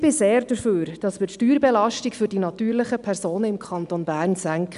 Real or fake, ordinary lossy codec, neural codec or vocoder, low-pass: real; none; none; 10.8 kHz